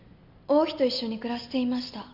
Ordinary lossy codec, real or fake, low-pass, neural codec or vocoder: none; real; 5.4 kHz; none